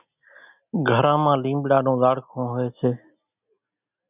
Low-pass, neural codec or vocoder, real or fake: 3.6 kHz; none; real